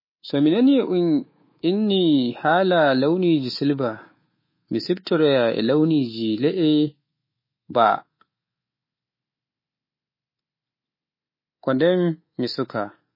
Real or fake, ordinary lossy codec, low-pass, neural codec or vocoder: fake; MP3, 24 kbps; 5.4 kHz; codec, 24 kHz, 3.1 kbps, DualCodec